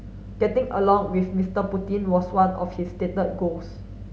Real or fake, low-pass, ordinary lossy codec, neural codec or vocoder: real; none; none; none